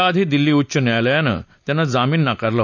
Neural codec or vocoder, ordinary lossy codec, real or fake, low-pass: none; none; real; 7.2 kHz